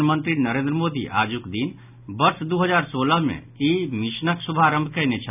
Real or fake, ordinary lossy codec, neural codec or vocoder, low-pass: real; none; none; 3.6 kHz